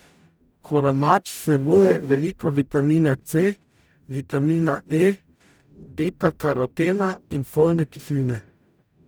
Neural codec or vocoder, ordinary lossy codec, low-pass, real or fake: codec, 44.1 kHz, 0.9 kbps, DAC; none; none; fake